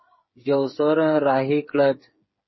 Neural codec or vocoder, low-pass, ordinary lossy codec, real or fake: codec, 16 kHz, 8 kbps, FreqCodec, smaller model; 7.2 kHz; MP3, 24 kbps; fake